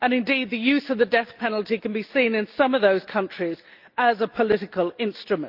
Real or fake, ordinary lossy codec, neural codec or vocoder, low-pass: real; Opus, 32 kbps; none; 5.4 kHz